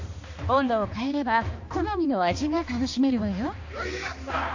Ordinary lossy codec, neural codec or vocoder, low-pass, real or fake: AAC, 48 kbps; codec, 16 kHz, 2 kbps, X-Codec, HuBERT features, trained on balanced general audio; 7.2 kHz; fake